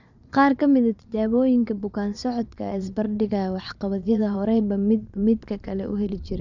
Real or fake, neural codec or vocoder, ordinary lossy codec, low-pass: fake; vocoder, 22.05 kHz, 80 mel bands, Vocos; none; 7.2 kHz